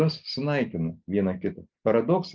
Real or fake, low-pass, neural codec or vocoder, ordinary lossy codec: real; 7.2 kHz; none; Opus, 24 kbps